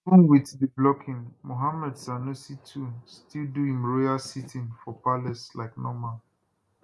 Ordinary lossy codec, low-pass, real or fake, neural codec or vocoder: none; none; real; none